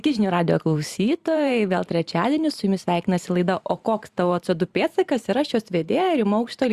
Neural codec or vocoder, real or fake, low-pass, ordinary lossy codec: vocoder, 44.1 kHz, 128 mel bands every 512 samples, BigVGAN v2; fake; 14.4 kHz; Opus, 64 kbps